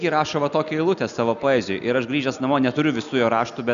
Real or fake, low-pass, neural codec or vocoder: real; 7.2 kHz; none